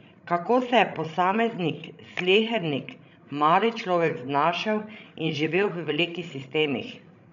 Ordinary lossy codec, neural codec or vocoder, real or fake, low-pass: none; codec, 16 kHz, 16 kbps, FreqCodec, larger model; fake; 7.2 kHz